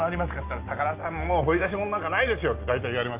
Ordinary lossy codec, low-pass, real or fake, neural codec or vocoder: Opus, 24 kbps; 3.6 kHz; real; none